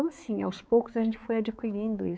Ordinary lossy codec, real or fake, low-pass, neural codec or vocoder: none; fake; none; codec, 16 kHz, 4 kbps, X-Codec, HuBERT features, trained on balanced general audio